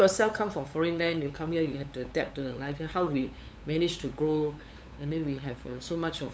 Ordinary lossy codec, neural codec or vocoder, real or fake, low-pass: none; codec, 16 kHz, 8 kbps, FunCodec, trained on LibriTTS, 25 frames a second; fake; none